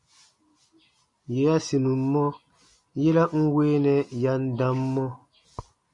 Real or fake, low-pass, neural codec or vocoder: real; 10.8 kHz; none